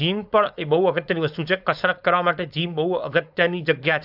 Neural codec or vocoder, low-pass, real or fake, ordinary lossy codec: codec, 16 kHz, 4.8 kbps, FACodec; 5.4 kHz; fake; AAC, 48 kbps